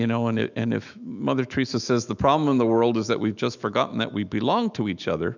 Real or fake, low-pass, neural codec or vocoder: fake; 7.2 kHz; autoencoder, 48 kHz, 128 numbers a frame, DAC-VAE, trained on Japanese speech